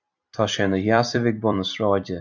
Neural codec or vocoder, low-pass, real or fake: vocoder, 44.1 kHz, 128 mel bands every 256 samples, BigVGAN v2; 7.2 kHz; fake